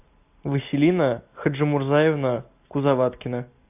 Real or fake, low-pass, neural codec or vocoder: real; 3.6 kHz; none